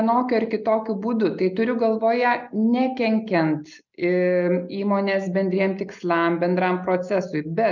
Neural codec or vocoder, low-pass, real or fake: none; 7.2 kHz; real